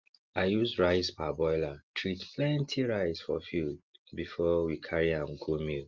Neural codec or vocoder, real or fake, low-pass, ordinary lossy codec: none; real; 7.2 kHz; Opus, 32 kbps